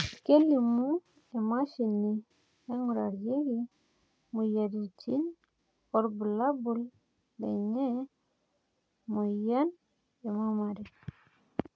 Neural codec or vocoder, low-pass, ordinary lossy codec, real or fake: none; none; none; real